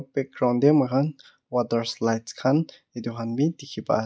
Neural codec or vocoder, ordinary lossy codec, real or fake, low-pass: none; none; real; none